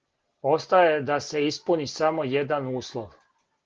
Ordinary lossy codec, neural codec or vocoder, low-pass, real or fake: Opus, 16 kbps; none; 7.2 kHz; real